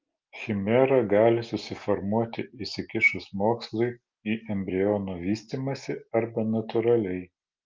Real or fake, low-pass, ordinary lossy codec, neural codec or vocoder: real; 7.2 kHz; Opus, 32 kbps; none